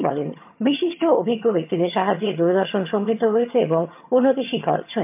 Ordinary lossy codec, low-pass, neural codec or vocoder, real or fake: MP3, 32 kbps; 3.6 kHz; vocoder, 22.05 kHz, 80 mel bands, HiFi-GAN; fake